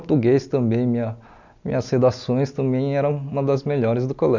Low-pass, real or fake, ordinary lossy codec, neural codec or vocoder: 7.2 kHz; real; none; none